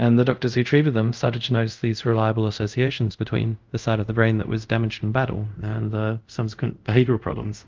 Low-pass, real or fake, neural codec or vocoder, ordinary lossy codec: 7.2 kHz; fake; codec, 24 kHz, 0.5 kbps, DualCodec; Opus, 24 kbps